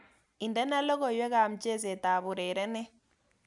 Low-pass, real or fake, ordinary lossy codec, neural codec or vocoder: 10.8 kHz; real; none; none